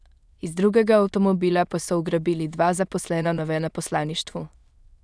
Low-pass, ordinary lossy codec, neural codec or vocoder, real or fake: none; none; autoencoder, 22.05 kHz, a latent of 192 numbers a frame, VITS, trained on many speakers; fake